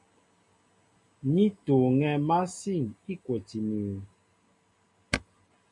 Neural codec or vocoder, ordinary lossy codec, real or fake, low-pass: none; MP3, 64 kbps; real; 10.8 kHz